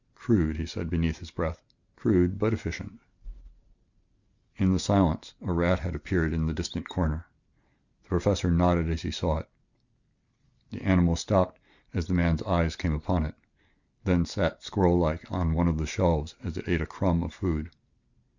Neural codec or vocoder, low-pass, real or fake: none; 7.2 kHz; real